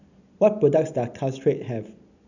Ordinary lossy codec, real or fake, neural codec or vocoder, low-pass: none; real; none; 7.2 kHz